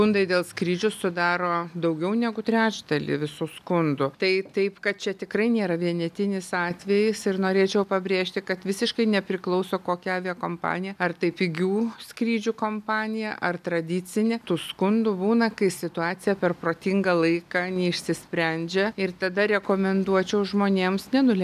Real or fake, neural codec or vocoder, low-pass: real; none; 14.4 kHz